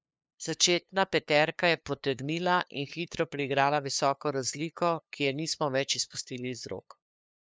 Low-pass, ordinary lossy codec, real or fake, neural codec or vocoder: none; none; fake; codec, 16 kHz, 2 kbps, FunCodec, trained on LibriTTS, 25 frames a second